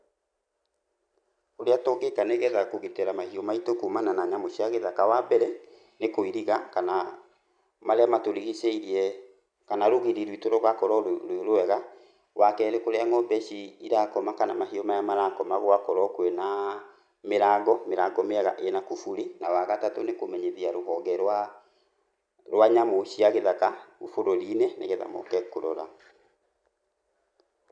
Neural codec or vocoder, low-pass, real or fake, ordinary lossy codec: none; 9.9 kHz; real; none